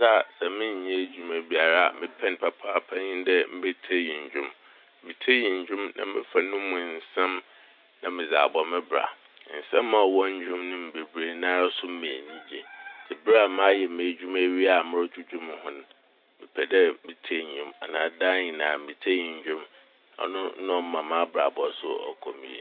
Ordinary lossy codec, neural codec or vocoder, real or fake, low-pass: none; none; real; 5.4 kHz